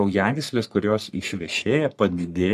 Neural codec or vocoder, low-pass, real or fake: codec, 44.1 kHz, 3.4 kbps, Pupu-Codec; 14.4 kHz; fake